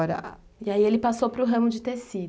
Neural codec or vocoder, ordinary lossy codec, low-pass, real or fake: none; none; none; real